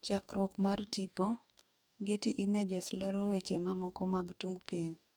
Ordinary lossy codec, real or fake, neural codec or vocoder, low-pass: none; fake; codec, 44.1 kHz, 2.6 kbps, DAC; none